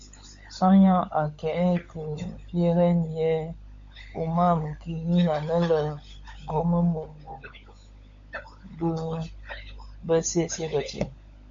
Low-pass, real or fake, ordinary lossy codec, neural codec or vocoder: 7.2 kHz; fake; MP3, 48 kbps; codec, 16 kHz, 16 kbps, FunCodec, trained on LibriTTS, 50 frames a second